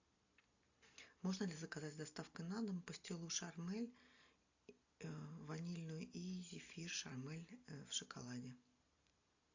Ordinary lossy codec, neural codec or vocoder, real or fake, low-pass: AAC, 48 kbps; none; real; 7.2 kHz